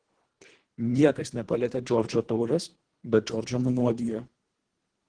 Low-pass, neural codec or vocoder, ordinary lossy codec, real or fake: 9.9 kHz; codec, 24 kHz, 1.5 kbps, HILCodec; Opus, 16 kbps; fake